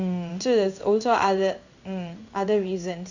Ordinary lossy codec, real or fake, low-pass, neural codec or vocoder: none; fake; 7.2 kHz; codec, 16 kHz in and 24 kHz out, 1 kbps, XY-Tokenizer